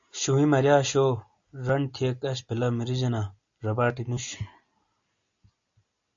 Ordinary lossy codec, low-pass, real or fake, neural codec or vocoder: AAC, 64 kbps; 7.2 kHz; real; none